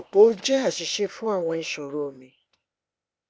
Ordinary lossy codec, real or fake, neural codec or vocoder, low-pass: none; fake; codec, 16 kHz, 0.8 kbps, ZipCodec; none